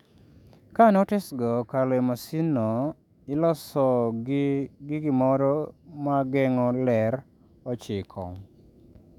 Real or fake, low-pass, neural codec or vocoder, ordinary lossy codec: fake; 19.8 kHz; autoencoder, 48 kHz, 128 numbers a frame, DAC-VAE, trained on Japanese speech; none